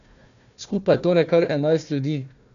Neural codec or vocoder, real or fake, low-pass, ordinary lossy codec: codec, 16 kHz, 1 kbps, FunCodec, trained on Chinese and English, 50 frames a second; fake; 7.2 kHz; none